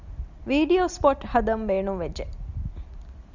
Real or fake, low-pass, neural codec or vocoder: real; 7.2 kHz; none